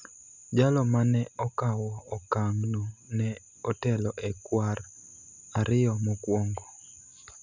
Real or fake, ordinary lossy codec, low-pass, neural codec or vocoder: real; none; 7.2 kHz; none